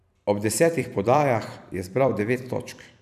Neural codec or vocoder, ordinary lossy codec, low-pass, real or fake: vocoder, 44.1 kHz, 128 mel bands every 256 samples, BigVGAN v2; none; 14.4 kHz; fake